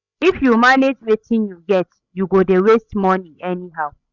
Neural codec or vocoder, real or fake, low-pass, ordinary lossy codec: codec, 16 kHz, 16 kbps, FreqCodec, larger model; fake; 7.2 kHz; none